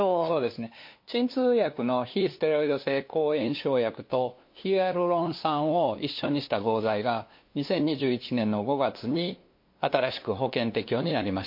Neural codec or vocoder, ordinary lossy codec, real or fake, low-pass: codec, 16 kHz, 2 kbps, FunCodec, trained on LibriTTS, 25 frames a second; MP3, 32 kbps; fake; 5.4 kHz